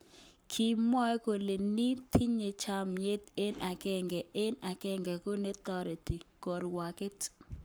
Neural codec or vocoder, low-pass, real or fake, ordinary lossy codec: codec, 44.1 kHz, 7.8 kbps, Pupu-Codec; none; fake; none